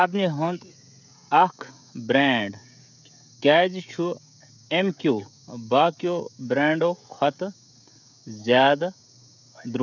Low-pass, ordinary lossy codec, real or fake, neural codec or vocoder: 7.2 kHz; none; fake; codec, 16 kHz, 16 kbps, FreqCodec, smaller model